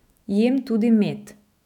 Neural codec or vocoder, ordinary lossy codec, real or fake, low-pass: autoencoder, 48 kHz, 128 numbers a frame, DAC-VAE, trained on Japanese speech; none; fake; 19.8 kHz